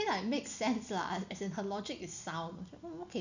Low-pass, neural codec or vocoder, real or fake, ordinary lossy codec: 7.2 kHz; none; real; none